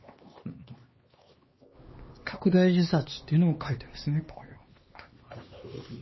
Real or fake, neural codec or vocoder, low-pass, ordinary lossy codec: fake; codec, 16 kHz, 2 kbps, X-Codec, HuBERT features, trained on LibriSpeech; 7.2 kHz; MP3, 24 kbps